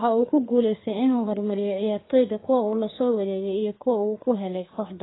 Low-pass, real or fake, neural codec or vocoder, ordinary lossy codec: 7.2 kHz; fake; codec, 32 kHz, 1.9 kbps, SNAC; AAC, 16 kbps